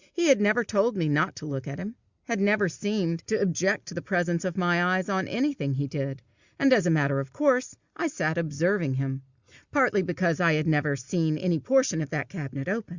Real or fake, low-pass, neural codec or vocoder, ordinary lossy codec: real; 7.2 kHz; none; Opus, 64 kbps